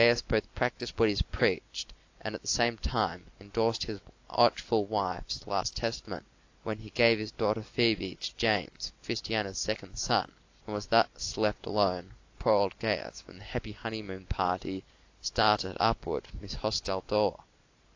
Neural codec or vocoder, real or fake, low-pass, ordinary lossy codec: none; real; 7.2 kHz; AAC, 48 kbps